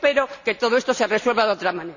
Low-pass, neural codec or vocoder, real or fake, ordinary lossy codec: 7.2 kHz; none; real; none